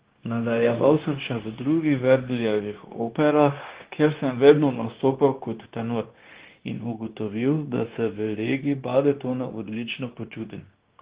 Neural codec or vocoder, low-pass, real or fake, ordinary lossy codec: codec, 16 kHz, 0.9 kbps, LongCat-Audio-Codec; 3.6 kHz; fake; Opus, 16 kbps